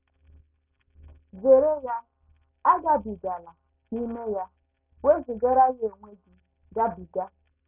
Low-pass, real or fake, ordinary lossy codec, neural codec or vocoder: 3.6 kHz; real; none; none